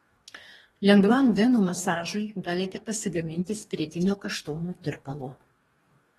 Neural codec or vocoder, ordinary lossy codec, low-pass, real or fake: codec, 44.1 kHz, 2.6 kbps, DAC; AAC, 32 kbps; 19.8 kHz; fake